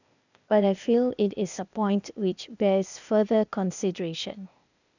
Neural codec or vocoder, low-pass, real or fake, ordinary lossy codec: codec, 16 kHz, 0.8 kbps, ZipCodec; 7.2 kHz; fake; none